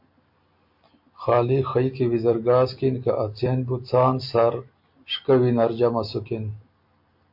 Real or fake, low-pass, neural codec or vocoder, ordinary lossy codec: real; 5.4 kHz; none; MP3, 32 kbps